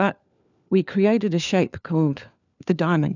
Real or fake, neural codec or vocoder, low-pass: fake; codec, 16 kHz, 4 kbps, FunCodec, trained on LibriTTS, 50 frames a second; 7.2 kHz